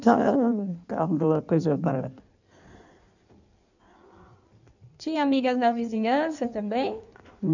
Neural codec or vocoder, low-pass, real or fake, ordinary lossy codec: codec, 16 kHz in and 24 kHz out, 1.1 kbps, FireRedTTS-2 codec; 7.2 kHz; fake; none